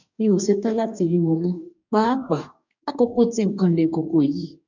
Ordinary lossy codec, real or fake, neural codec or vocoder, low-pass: none; fake; codec, 44.1 kHz, 2.6 kbps, DAC; 7.2 kHz